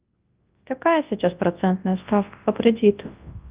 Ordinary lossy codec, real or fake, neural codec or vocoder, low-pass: Opus, 32 kbps; fake; codec, 24 kHz, 0.9 kbps, WavTokenizer, large speech release; 3.6 kHz